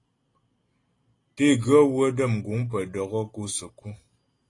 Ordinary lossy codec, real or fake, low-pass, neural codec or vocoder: AAC, 32 kbps; real; 10.8 kHz; none